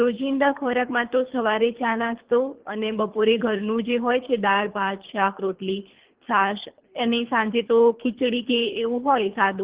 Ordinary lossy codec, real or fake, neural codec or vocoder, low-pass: Opus, 16 kbps; fake; codec, 24 kHz, 3 kbps, HILCodec; 3.6 kHz